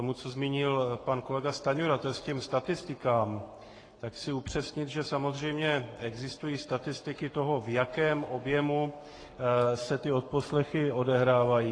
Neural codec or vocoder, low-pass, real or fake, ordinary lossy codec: codec, 44.1 kHz, 7.8 kbps, Pupu-Codec; 9.9 kHz; fake; AAC, 32 kbps